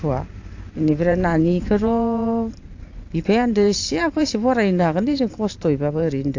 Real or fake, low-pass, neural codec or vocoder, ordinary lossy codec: fake; 7.2 kHz; vocoder, 44.1 kHz, 128 mel bands every 512 samples, BigVGAN v2; AAC, 48 kbps